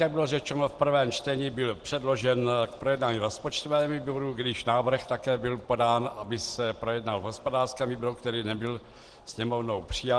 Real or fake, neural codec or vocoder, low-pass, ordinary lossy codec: real; none; 10.8 kHz; Opus, 16 kbps